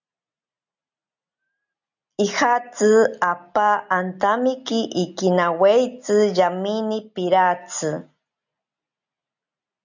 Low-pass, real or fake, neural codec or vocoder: 7.2 kHz; real; none